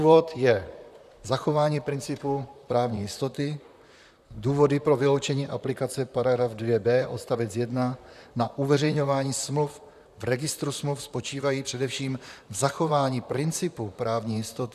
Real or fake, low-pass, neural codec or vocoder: fake; 14.4 kHz; vocoder, 44.1 kHz, 128 mel bands, Pupu-Vocoder